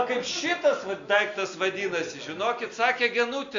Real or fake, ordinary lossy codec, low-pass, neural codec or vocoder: real; Opus, 64 kbps; 7.2 kHz; none